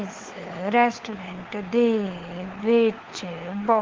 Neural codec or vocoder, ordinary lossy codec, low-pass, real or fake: codec, 16 kHz, 4 kbps, FunCodec, trained on LibriTTS, 50 frames a second; Opus, 16 kbps; 7.2 kHz; fake